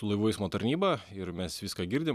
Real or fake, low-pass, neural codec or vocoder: real; 14.4 kHz; none